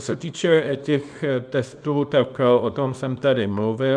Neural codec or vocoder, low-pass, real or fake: codec, 24 kHz, 0.9 kbps, WavTokenizer, small release; 9.9 kHz; fake